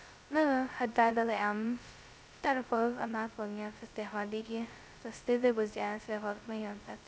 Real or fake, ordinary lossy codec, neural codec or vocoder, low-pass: fake; none; codec, 16 kHz, 0.2 kbps, FocalCodec; none